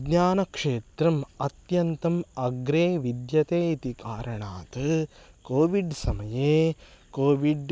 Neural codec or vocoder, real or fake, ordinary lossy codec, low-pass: none; real; none; none